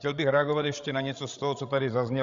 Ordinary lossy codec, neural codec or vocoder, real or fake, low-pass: Opus, 64 kbps; codec, 16 kHz, 16 kbps, FreqCodec, larger model; fake; 7.2 kHz